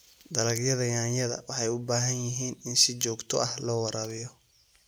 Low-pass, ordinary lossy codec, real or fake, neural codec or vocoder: none; none; real; none